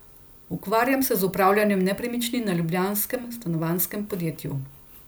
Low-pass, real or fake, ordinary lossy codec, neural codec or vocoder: none; real; none; none